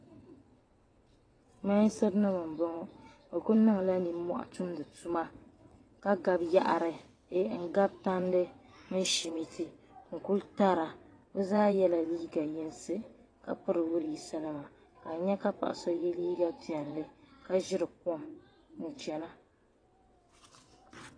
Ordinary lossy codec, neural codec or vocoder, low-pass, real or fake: AAC, 32 kbps; vocoder, 22.05 kHz, 80 mel bands, WaveNeXt; 9.9 kHz; fake